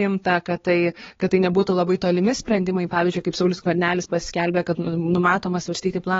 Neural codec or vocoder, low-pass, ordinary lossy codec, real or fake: codec, 16 kHz, 4 kbps, X-Codec, HuBERT features, trained on balanced general audio; 7.2 kHz; AAC, 24 kbps; fake